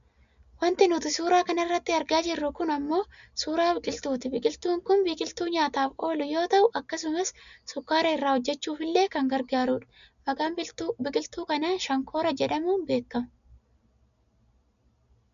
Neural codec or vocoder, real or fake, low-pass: none; real; 7.2 kHz